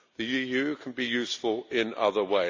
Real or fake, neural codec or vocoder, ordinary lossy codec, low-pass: real; none; AAC, 48 kbps; 7.2 kHz